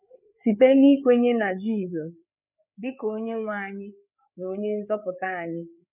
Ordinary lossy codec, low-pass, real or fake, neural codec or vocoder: none; 3.6 kHz; fake; codec, 16 kHz, 4 kbps, FreqCodec, larger model